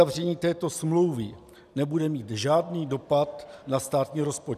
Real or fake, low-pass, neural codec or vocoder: real; 14.4 kHz; none